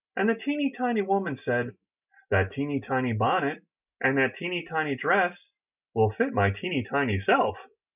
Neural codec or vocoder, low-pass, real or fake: none; 3.6 kHz; real